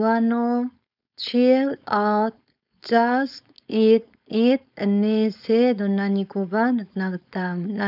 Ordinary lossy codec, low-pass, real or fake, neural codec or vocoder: none; 5.4 kHz; fake; codec, 16 kHz, 4.8 kbps, FACodec